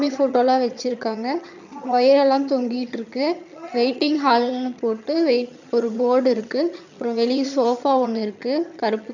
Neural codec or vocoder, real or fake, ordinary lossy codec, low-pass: vocoder, 22.05 kHz, 80 mel bands, HiFi-GAN; fake; none; 7.2 kHz